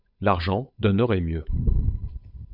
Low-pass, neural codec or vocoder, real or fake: 5.4 kHz; codec, 16 kHz, 8 kbps, FunCodec, trained on Chinese and English, 25 frames a second; fake